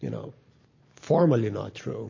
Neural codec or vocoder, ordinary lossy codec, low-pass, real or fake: none; MP3, 32 kbps; 7.2 kHz; real